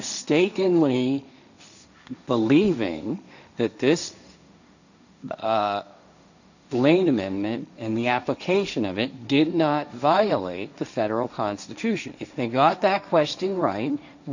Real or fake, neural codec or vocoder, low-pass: fake; codec, 16 kHz, 1.1 kbps, Voila-Tokenizer; 7.2 kHz